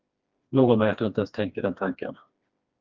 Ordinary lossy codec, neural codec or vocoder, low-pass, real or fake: Opus, 32 kbps; codec, 16 kHz, 2 kbps, FreqCodec, smaller model; 7.2 kHz; fake